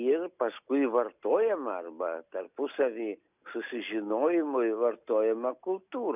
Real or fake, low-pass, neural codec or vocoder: real; 3.6 kHz; none